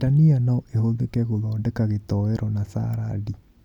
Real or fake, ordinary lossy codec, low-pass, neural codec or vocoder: real; none; 19.8 kHz; none